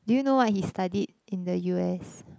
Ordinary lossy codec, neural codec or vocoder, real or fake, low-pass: none; none; real; none